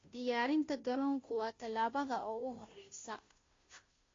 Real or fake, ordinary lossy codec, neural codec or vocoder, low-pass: fake; AAC, 32 kbps; codec, 16 kHz, 0.5 kbps, FunCodec, trained on Chinese and English, 25 frames a second; 7.2 kHz